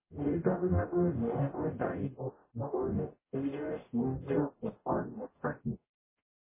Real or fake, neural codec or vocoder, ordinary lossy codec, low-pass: fake; codec, 44.1 kHz, 0.9 kbps, DAC; MP3, 16 kbps; 3.6 kHz